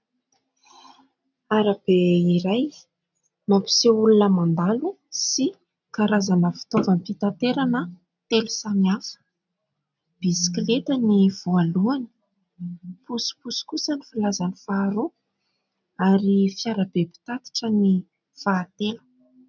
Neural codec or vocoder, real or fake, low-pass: none; real; 7.2 kHz